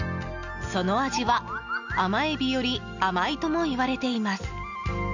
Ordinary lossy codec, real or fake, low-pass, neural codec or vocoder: none; real; 7.2 kHz; none